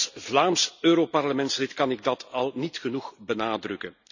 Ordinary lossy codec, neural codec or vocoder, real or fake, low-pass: none; none; real; 7.2 kHz